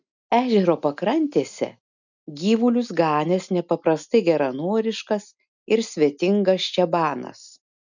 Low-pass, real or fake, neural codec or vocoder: 7.2 kHz; real; none